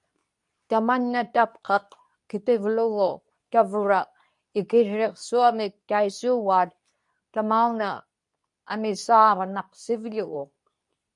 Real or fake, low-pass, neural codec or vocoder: fake; 10.8 kHz; codec, 24 kHz, 0.9 kbps, WavTokenizer, medium speech release version 2